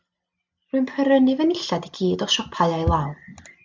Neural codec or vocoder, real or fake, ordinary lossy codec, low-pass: none; real; MP3, 64 kbps; 7.2 kHz